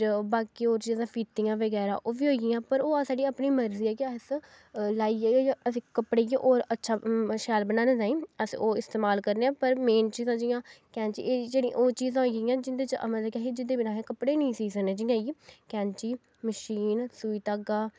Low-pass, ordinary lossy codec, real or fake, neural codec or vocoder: none; none; real; none